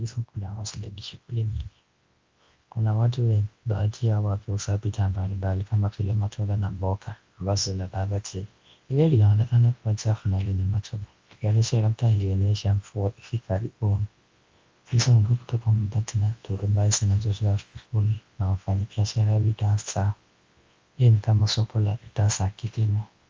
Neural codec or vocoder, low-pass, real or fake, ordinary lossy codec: codec, 24 kHz, 0.9 kbps, WavTokenizer, large speech release; 7.2 kHz; fake; Opus, 32 kbps